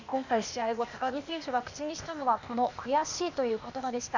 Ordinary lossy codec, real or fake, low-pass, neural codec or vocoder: none; fake; 7.2 kHz; codec, 16 kHz, 0.8 kbps, ZipCodec